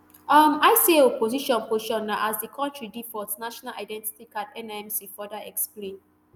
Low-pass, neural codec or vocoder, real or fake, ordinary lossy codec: none; none; real; none